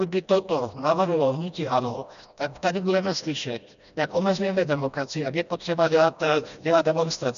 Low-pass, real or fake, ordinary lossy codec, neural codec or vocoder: 7.2 kHz; fake; MP3, 96 kbps; codec, 16 kHz, 1 kbps, FreqCodec, smaller model